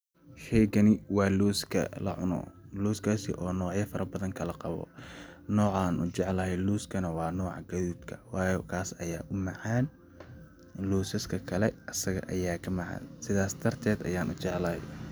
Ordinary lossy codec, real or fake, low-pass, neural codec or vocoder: none; real; none; none